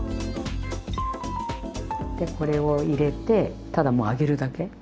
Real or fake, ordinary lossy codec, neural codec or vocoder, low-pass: real; none; none; none